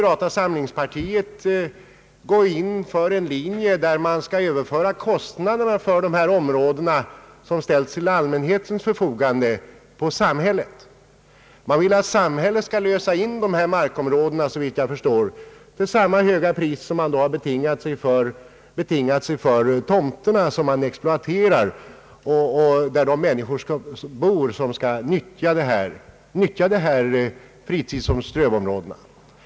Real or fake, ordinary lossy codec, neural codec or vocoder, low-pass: real; none; none; none